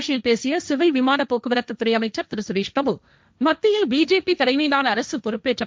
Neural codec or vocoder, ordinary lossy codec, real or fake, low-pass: codec, 16 kHz, 1.1 kbps, Voila-Tokenizer; none; fake; none